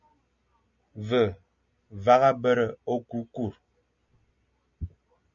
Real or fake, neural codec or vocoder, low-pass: real; none; 7.2 kHz